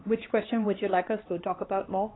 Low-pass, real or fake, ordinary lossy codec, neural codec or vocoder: 7.2 kHz; fake; AAC, 16 kbps; codec, 16 kHz, 2 kbps, X-Codec, HuBERT features, trained on LibriSpeech